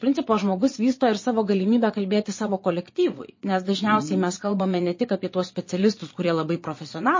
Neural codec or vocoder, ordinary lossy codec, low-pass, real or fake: vocoder, 22.05 kHz, 80 mel bands, Vocos; MP3, 32 kbps; 7.2 kHz; fake